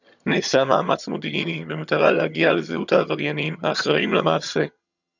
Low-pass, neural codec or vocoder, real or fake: 7.2 kHz; vocoder, 22.05 kHz, 80 mel bands, HiFi-GAN; fake